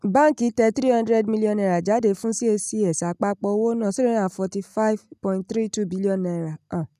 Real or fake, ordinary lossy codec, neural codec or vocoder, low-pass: real; none; none; 14.4 kHz